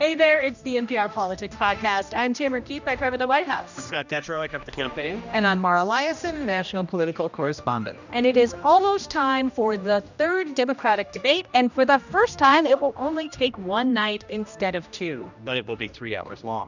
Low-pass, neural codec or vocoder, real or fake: 7.2 kHz; codec, 16 kHz, 1 kbps, X-Codec, HuBERT features, trained on general audio; fake